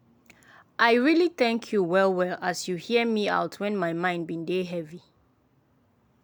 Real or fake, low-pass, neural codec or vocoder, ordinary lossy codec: real; none; none; none